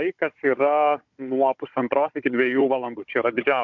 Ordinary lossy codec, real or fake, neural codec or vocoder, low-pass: MP3, 64 kbps; fake; codec, 16 kHz, 16 kbps, FunCodec, trained on Chinese and English, 50 frames a second; 7.2 kHz